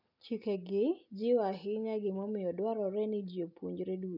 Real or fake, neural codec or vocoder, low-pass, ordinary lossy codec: real; none; 5.4 kHz; none